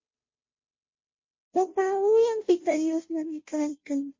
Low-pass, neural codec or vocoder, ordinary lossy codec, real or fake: 7.2 kHz; codec, 16 kHz, 0.5 kbps, FunCodec, trained on Chinese and English, 25 frames a second; MP3, 32 kbps; fake